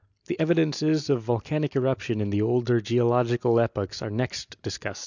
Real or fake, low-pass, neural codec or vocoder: real; 7.2 kHz; none